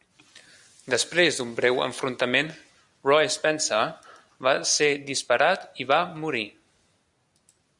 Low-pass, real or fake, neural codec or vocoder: 10.8 kHz; real; none